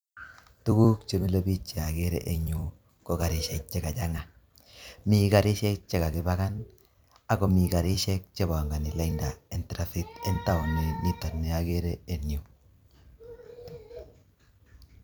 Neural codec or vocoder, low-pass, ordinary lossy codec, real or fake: vocoder, 44.1 kHz, 128 mel bands every 256 samples, BigVGAN v2; none; none; fake